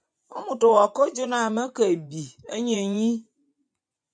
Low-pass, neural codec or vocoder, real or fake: 9.9 kHz; vocoder, 24 kHz, 100 mel bands, Vocos; fake